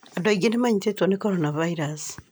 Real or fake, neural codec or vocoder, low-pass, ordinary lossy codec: real; none; none; none